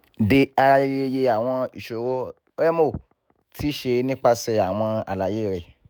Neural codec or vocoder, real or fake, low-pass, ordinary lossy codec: autoencoder, 48 kHz, 128 numbers a frame, DAC-VAE, trained on Japanese speech; fake; none; none